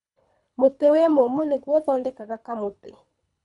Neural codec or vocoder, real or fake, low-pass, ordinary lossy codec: codec, 24 kHz, 3 kbps, HILCodec; fake; 10.8 kHz; MP3, 96 kbps